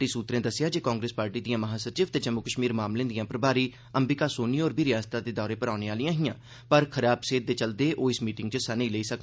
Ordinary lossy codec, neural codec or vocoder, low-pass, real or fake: none; none; none; real